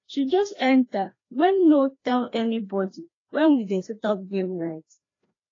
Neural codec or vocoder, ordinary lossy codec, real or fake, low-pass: codec, 16 kHz, 1 kbps, FreqCodec, larger model; AAC, 32 kbps; fake; 7.2 kHz